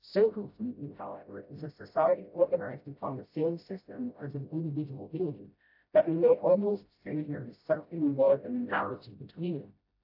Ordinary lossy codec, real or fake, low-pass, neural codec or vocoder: AAC, 48 kbps; fake; 5.4 kHz; codec, 16 kHz, 0.5 kbps, FreqCodec, smaller model